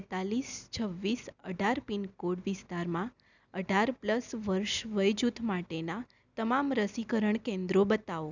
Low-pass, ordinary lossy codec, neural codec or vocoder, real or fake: 7.2 kHz; none; none; real